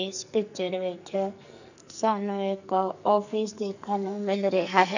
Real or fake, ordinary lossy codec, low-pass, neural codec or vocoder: fake; none; 7.2 kHz; codec, 44.1 kHz, 2.6 kbps, SNAC